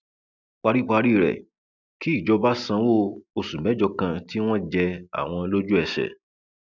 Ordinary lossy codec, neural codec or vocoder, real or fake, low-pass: none; none; real; 7.2 kHz